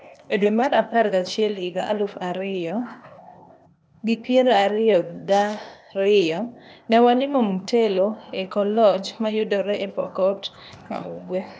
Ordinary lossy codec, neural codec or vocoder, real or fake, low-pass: none; codec, 16 kHz, 0.8 kbps, ZipCodec; fake; none